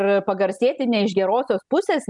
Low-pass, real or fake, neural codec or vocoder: 10.8 kHz; real; none